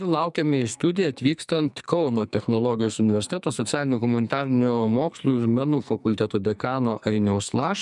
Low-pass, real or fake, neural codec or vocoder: 10.8 kHz; fake; codec, 44.1 kHz, 2.6 kbps, SNAC